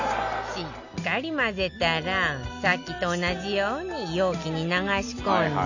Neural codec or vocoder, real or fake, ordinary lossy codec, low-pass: none; real; none; 7.2 kHz